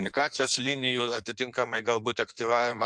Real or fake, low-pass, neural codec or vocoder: fake; 9.9 kHz; codec, 16 kHz in and 24 kHz out, 1.1 kbps, FireRedTTS-2 codec